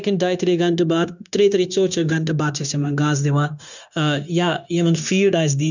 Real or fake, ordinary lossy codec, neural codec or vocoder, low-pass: fake; none; codec, 16 kHz, 0.9 kbps, LongCat-Audio-Codec; 7.2 kHz